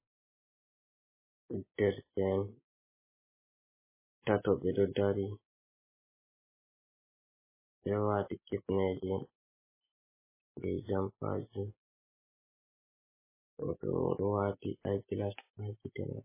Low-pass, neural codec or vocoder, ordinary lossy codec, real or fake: 3.6 kHz; none; MP3, 16 kbps; real